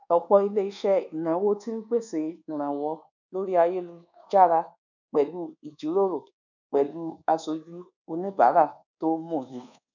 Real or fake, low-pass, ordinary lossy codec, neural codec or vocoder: fake; 7.2 kHz; none; codec, 24 kHz, 1.2 kbps, DualCodec